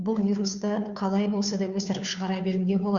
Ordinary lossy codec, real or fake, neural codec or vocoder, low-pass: Opus, 64 kbps; fake; codec, 16 kHz, 2 kbps, FunCodec, trained on Chinese and English, 25 frames a second; 7.2 kHz